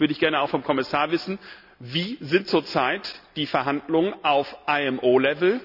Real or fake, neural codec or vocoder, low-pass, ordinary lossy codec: real; none; 5.4 kHz; none